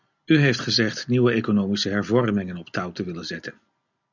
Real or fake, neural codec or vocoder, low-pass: real; none; 7.2 kHz